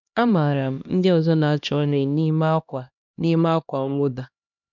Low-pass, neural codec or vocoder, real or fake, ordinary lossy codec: 7.2 kHz; codec, 16 kHz, 1 kbps, X-Codec, HuBERT features, trained on LibriSpeech; fake; none